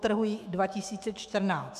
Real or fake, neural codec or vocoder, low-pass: fake; autoencoder, 48 kHz, 128 numbers a frame, DAC-VAE, trained on Japanese speech; 14.4 kHz